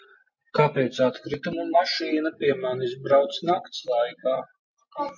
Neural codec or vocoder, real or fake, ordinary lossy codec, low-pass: none; real; MP3, 48 kbps; 7.2 kHz